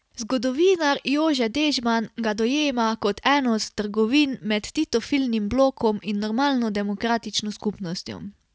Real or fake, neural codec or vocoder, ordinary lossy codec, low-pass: real; none; none; none